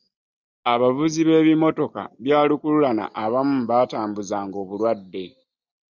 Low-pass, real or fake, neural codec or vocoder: 7.2 kHz; real; none